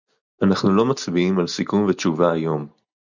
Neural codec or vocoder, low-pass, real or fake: none; 7.2 kHz; real